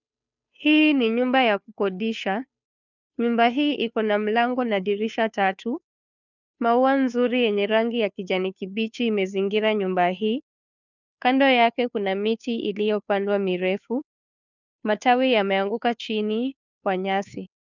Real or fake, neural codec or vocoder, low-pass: fake; codec, 16 kHz, 2 kbps, FunCodec, trained on Chinese and English, 25 frames a second; 7.2 kHz